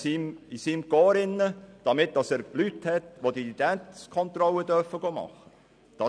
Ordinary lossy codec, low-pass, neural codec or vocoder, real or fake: none; 9.9 kHz; none; real